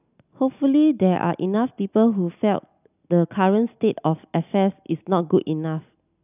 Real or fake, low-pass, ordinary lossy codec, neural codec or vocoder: real; 3.6 kHz; none; none